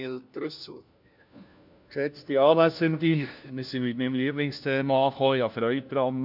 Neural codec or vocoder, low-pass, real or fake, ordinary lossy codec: codec, 16 kHz, 1 kbps, FunCodec, trained on LibriTTS, 50 frames a second; 5.4 kHz; fake; none